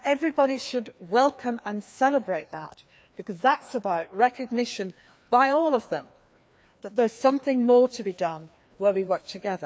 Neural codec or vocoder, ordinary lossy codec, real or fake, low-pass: codec, 16 kHz, 2 kbps, FreqCodec, larger model; none; fake; none